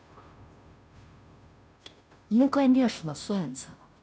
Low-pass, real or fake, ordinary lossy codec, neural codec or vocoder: none; fake; none; codec, 16 kHz, 0.5 kbps, FunCodec, trained on Chinese and English, 25 frames a second